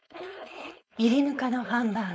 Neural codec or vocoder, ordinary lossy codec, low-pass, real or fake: codec, 16 kHz, 4.8 kbps, FACodec; none; none; fake